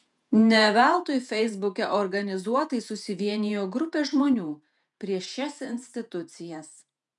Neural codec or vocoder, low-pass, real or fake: vocoder, 48 kHz, 128 mel bands, Vocos; 10.8 kHz; fake